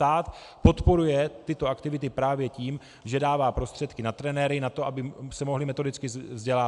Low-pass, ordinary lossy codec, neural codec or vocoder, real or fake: 10.8 kHz; AAC, 96 kbps; none; real